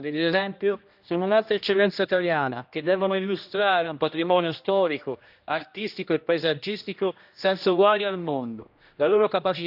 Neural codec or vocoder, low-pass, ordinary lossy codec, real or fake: codec, 16 kHz, 1 kbps, X-Codec, HuBERT features, trained on general audio; 5.4 kHz; none; fake